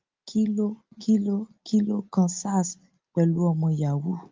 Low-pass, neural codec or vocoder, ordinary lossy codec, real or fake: 7.2 kHz; none; Opus, 24 kbps; real